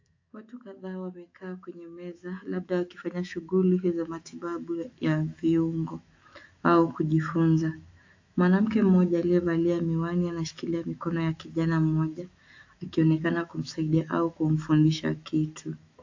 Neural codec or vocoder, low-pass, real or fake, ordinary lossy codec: autoencoder, 48 kHz, 128 numbers a frame, DAC-VAE, trained on Japanese speech; 7.2 kHz; fake; AAC, 48 kbps